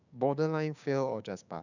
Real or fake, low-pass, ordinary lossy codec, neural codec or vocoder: fake; 7.2 kHz; none; codec, 16 kHz, 6 kbps, DAC